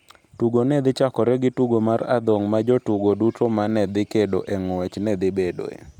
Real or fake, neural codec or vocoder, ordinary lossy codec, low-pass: real; none; none; 19.8 kHz